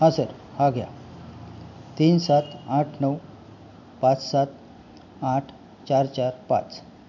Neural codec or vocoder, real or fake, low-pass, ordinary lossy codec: none; real; 7.2 kHz; none